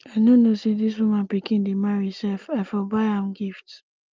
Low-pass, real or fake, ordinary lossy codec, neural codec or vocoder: 7.2 kHz; real; Opus, 32 kbps; none